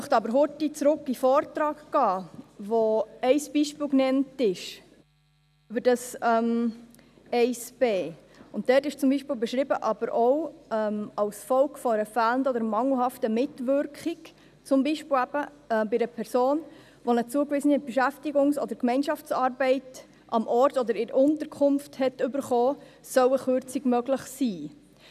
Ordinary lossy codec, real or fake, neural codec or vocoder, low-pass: none; real; none; 14.4 kHz